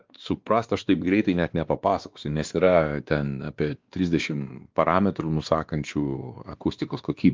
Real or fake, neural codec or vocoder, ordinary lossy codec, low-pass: fake; codec, 16 kHz, 2 kbps, X-Codec, WavLM features, trained on Multilingual LibriSpeech; Opus, 32 kbps; 7.2 kHz